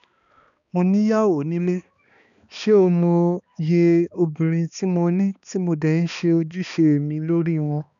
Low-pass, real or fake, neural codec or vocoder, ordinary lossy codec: 7.2 kHz; fake; codec, 16 kHz, 2 kbps, X-Codec, HuBERT features, trained on balanced general audio; none